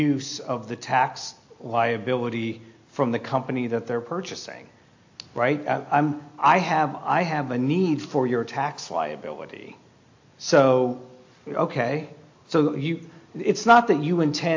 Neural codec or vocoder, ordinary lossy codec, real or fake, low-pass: none; AAC, 48 kbps; real; 7.2 kHz